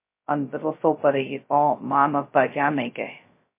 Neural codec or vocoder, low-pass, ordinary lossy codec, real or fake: codec, 16 kHz, 0.2 kbps, FocalCodec; 3.6 kHz; MP3, 24 kbps; fake